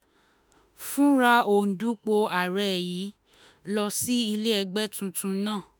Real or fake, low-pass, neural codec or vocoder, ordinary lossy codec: fake; none; autoencoder, 48 kHz, 32 numbers a frame, DAC-VAE, trained on Japanese speech; none